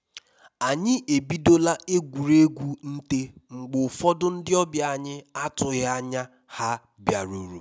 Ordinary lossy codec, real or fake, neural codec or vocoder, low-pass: none; real; none; none